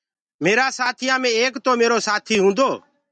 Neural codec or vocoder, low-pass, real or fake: none; 9.9 kHz; real